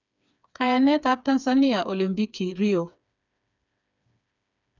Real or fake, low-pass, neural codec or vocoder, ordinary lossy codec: fake; 7.2 kHz; codec, 16 kHz, 4 kbps, FreqCodec, smaller model; none